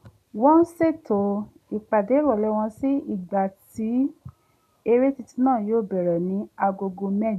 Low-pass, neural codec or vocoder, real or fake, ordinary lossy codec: 14.4 kHz; none; real; none